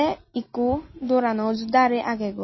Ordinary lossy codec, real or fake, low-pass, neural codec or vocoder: MP3, 24 kbps; real; 7.2 kHz; none